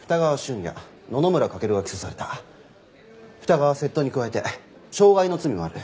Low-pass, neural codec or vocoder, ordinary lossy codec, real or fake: none; none; none; real